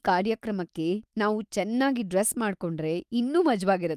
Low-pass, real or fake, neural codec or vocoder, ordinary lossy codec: 14.4 kHz; fake; autoencoder, 48 kHz, 128 numbers a frame, DAC-VAE, trained on Japanese speech; Opus, 32 kbps